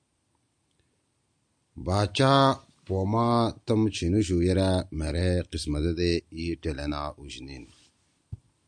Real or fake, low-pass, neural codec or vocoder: real; 9.9 kHz; none